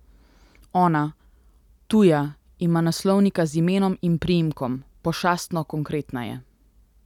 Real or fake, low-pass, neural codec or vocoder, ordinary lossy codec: real; 19.8 kHz; none; none